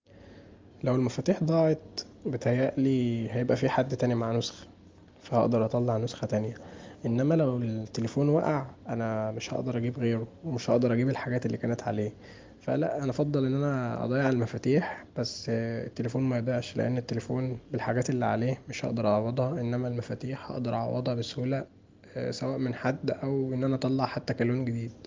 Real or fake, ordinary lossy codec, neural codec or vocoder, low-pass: real; Opus, 32 kbps; none; 7.2 kHz